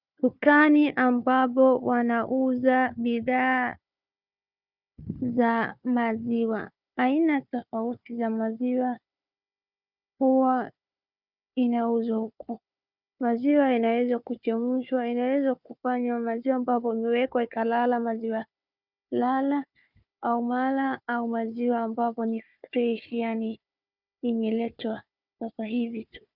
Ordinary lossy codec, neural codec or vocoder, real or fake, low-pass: Opus, 64 kbps; codec, 16 kHz, 4 kbps, FunCodec, trained on Chinese and English, 50 frames a second; fake; 5.4 kHz